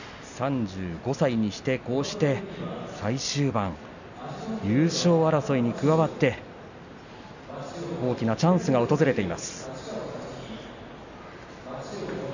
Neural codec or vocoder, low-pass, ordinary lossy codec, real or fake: none; 7.2 kHz; none; real